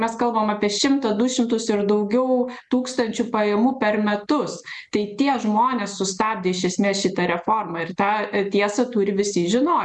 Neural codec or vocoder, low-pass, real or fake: none; 10.8 kHz; real